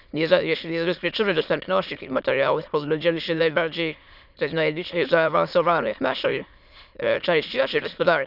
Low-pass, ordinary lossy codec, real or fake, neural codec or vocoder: 5.4 kHz; none; fake; autoencoder, 22.05 kHz, a latent of 192 numbers a frame, VITS, trained on many speakers